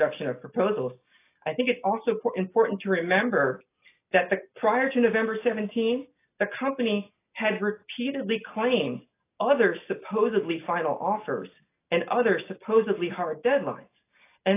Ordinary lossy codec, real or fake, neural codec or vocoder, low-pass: AAC, 24 kbps; real; none; 3.6 kHz